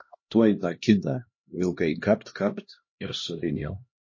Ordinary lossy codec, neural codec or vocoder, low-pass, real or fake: MP3, 32 kbps; codec, 16 kHz, 1 kbps, X-Codec, HuBERT features, trained on LibriSpeech; 7.2 kHz; fake